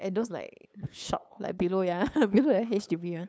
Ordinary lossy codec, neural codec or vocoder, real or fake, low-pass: none; codec, 16 kHz, 8 kbps, FunCodec, trained on LibriTTS, 25 frames a second; fake; none